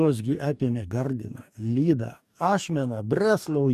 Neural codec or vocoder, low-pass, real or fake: codec, 44.1 kHz, 2.6 kbps, DAC; 14.4 kHz; fake